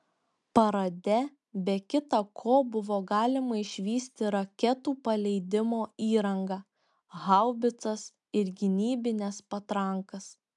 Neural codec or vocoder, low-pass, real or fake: none; 10.8 kHz; real